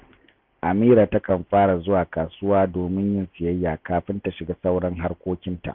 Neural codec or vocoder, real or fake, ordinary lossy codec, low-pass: none; real; none; 5.4 kHz